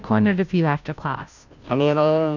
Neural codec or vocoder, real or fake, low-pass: codec, 16 kHz, 0.5 kbps, FunCodec, trained on Chinese and English, 25 frames a second; fake; 7.2 kHz